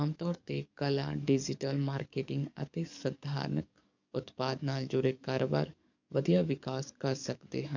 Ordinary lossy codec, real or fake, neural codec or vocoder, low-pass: none; fake; codec, 44.1 kHz, 7.8 kbps, DAC; 7.2 kHz